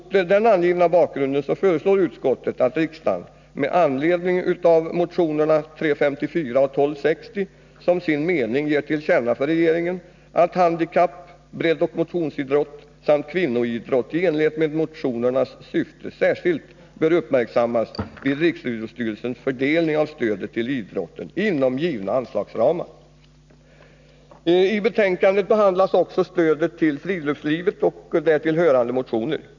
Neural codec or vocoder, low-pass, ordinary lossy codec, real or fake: none; 7.2 kHz; none; real